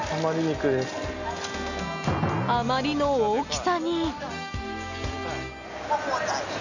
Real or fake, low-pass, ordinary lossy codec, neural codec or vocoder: real; 7.2 kHz; none; none